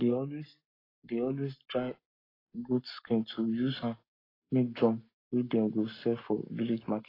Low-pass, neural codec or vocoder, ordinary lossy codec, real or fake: 5.4 kHz; codec, 44.1 kHz, 7.8 kbps, Pupu-Codec; AAC, 24 kbps; fake